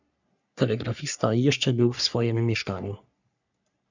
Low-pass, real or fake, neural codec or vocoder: 7.2 kHz; fake; codec, 44.1 kHz, 3.4 kbps, Pupu-Codec